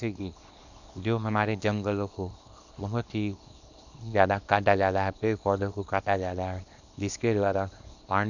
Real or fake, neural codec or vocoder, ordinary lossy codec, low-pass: fake; codec, 24 kHz, 0.9 kbps, WavTokenizer, small release; none; 7.2 kHz